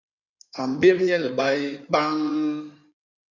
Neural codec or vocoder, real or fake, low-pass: codec, 16 kHz in and 24 kHz out, 2.2 kbps, FireRedTTS-2 codec; fake; 7.2 kHz